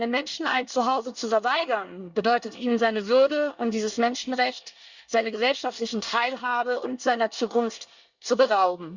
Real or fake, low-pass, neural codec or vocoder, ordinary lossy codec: fake; 7.2 kHz; codec, 24 kHz, 1 kbps, SNAC; Opus, 64 kbps